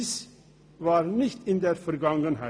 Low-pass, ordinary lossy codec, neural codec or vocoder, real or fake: none; none; none; real